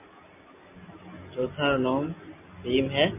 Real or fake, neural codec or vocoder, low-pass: real; none; 3.6 kHz